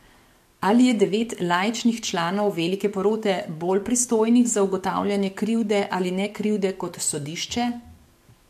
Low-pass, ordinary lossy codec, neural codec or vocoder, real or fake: 14.4 kHz; MP3, 64 kbps; codec, 44.1 kHz, 7.8 kbps, DAC; fake